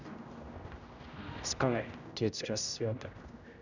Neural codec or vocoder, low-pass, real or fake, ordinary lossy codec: codec, 16 kHz, 0.5 kbps, X-Codec, HuBERT features, trained on general audio; 7.2 kHz; fake; none